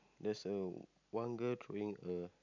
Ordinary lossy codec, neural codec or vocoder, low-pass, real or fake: none; none; 7.2 kHz; real